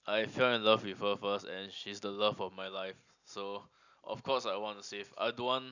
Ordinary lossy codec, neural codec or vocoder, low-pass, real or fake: none; none; 7.2 kHz; real